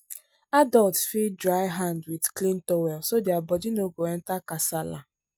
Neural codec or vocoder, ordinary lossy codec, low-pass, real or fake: none; none; none; real